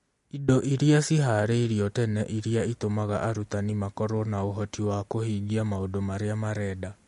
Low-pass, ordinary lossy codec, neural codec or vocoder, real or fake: 14.4 kHz; MP3, 48 kbps; none; real